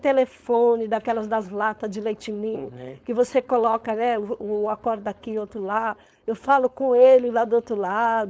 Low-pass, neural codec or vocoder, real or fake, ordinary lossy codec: none; codec, 16 kHz, 4.8 kbps, FACodec; fake; none